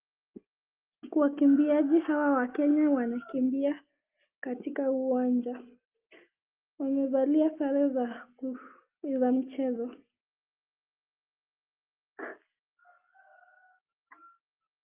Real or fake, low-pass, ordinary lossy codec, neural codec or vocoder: real; 3.6 kHz; Opus, 32 kbps; none